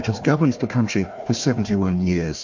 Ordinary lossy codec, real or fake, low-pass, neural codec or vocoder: MP3, 48 kbps; fake; 7.2 kHz; codec, 16 kHz, 1 kbps, FunCodec, trained on Chinese and English, 50 frames a second